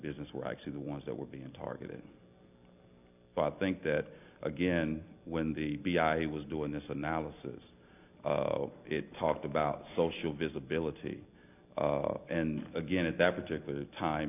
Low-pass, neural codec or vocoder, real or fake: 3.6 kHz; none; real